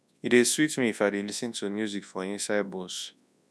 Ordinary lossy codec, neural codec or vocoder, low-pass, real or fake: none; codec, 24 kHz, 0.9 kbps, WavTokenizer, large speech release; none; fake